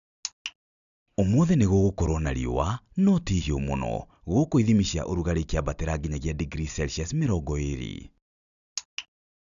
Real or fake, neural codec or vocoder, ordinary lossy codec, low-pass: real; none; none; 7.2 kHz